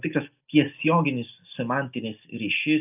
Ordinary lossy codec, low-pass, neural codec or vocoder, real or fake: AAC, 32 kbps; 3.6 kHz; none; real